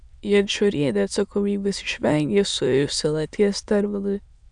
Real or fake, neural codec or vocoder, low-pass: fake; autoencoder, 22.05 kHz, a latent of 192 numbers a frame, VITS, trained on many speakers; 9.9 kHz